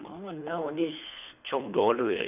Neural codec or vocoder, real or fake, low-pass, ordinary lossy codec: codec, 24 kHz, 3 kbps, HILCodec; fake; 3.6 kHz; none